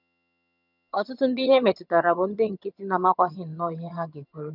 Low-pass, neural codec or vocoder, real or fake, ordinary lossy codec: 5.4 kHz; vocoder, 22.05 kHz, 80 mel bands, HiFi-GAN; fake; none